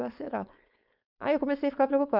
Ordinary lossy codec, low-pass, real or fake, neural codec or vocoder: none; 5.4 kHz; fake; codec, 16 kHz, 4.8 kbps, FACodec